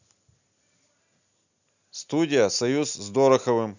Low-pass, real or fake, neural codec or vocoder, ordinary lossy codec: 7.2 kHz; real; none; none